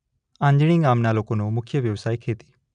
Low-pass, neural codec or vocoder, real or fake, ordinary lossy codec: 9.9 kHz; none; real; none